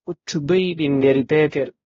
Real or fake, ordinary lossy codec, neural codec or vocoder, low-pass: fake; AAC, 24 kbps; codec, 16 kHz, 0.5 kbps, X-Codec, HuBERT features, trained on balanced general audio; 7.2 kHz